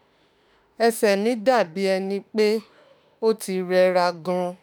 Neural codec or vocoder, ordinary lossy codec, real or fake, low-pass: autoencoder, 48 kHz, 32 numbers a frame, DAC-VAE, trained on Japanese speech; none; fake; none